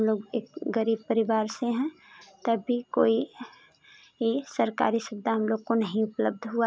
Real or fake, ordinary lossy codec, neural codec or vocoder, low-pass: real; none; none; none